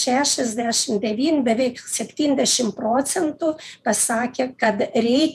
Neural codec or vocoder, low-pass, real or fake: vocoder, 48 kHz, 128 mel bands, Vocos; 14.4 kHz; fake